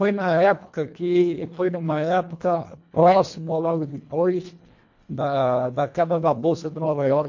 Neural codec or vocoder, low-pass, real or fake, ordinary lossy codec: codec, 24 kHz, 1.5 kbps, HILCodec; 7.2 kHz; fake; MP3, 48 kbps